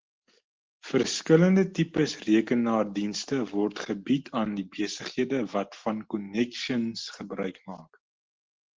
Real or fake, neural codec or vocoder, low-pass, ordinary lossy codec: real; none; 7.2 kHz; Opus, 16 kbps